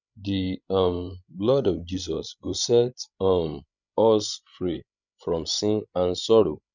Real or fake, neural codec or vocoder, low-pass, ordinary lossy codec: fake; codec, 16 kHz, 16 kbps, FreqCodec, larger model; 7.2 kHz; none